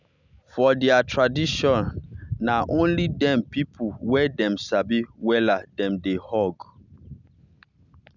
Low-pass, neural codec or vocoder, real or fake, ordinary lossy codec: 7.2 kHz; none; real; none